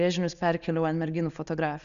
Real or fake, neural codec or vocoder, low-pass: real; none; 7.2 kHz